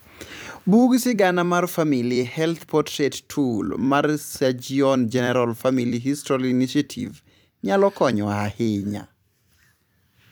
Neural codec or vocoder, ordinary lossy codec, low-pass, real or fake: vocoder, 44.1 kHz, 128 mel bands every 256 samples, BigVGAN v2; none; none; fake